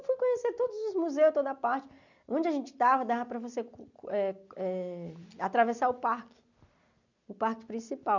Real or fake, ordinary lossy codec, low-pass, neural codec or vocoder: real; none; 7.2 kHz; none